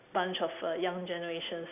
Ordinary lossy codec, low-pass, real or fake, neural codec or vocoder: none; 3.6 kHz; real; none